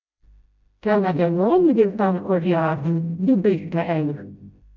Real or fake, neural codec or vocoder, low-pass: fake; codec, 16 kHz, 0.5 kbps, FreqCodec, smaller model; 7.2 kHz